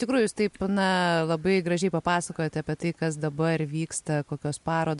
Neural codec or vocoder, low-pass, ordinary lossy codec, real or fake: none; 10.8 kHz; MP3, 64 kbps; real